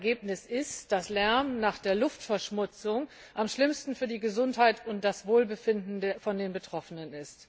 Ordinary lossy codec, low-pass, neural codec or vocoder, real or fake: none; none; none; real